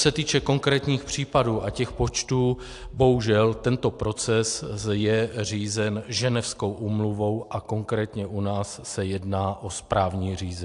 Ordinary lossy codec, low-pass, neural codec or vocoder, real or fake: AAC, 64 kbps; 10.8 kHz; none; real